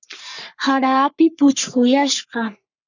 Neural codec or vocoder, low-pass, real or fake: codec, 44.1 kHz, 3.4 kbps, Pupu-Codec; 7.2 kHz; fake